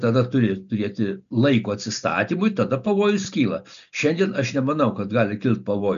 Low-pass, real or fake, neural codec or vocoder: 7.2 kHz; real; none